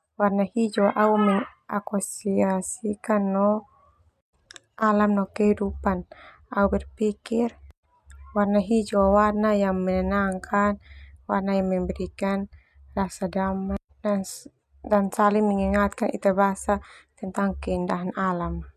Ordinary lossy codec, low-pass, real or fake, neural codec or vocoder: MP3, 96 kbps; 14.4 kHz; real; none